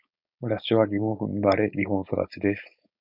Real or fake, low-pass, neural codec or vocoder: fake; 5.4 kHz; codec, 16 kHz, 4.8 kbps, FACodec